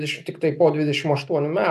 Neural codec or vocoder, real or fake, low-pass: none; real; 14.4 kHz